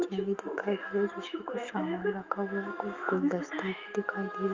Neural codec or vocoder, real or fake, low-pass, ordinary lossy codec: autoencoder, 48 kHz, 128 numbers a frame, DAC-VAE, trained on Japanese speech; fake; 7.2 kHz; Opus, 32 kbps